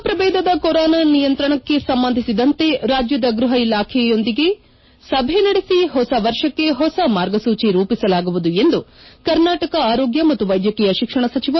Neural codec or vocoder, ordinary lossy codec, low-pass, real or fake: none; MP3, 24 kbps; 7.2 kHz; real